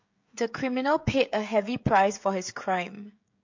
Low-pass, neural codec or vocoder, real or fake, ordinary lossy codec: 7.2 kHz; codec, 16 kHz, 16 kbps, FreqCodec, smaller model; fake; MP3, 48 kbps